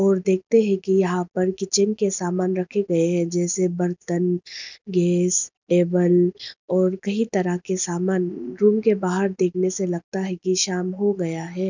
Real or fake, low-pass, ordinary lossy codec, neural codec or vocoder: real; 7.2 kHz; none; none